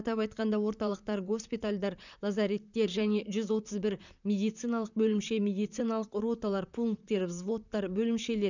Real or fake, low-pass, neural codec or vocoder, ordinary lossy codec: fake; 7.2 kHz; vocoder, 44.1 kHz, 128 mel bands, Pupu-Vocoder; none